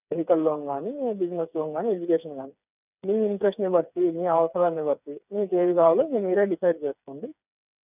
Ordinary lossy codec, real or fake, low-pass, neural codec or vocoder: none; fake; 3.6 kHz; codec, 16 kHz, 4 kbps, FreqCodec, smaller model